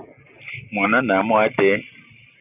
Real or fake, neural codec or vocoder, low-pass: real; none; 3.6 kHz